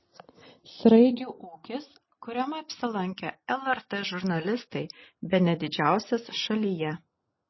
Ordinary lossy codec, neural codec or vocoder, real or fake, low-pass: MP3, 24 kbps; none; real; 7.2 kHz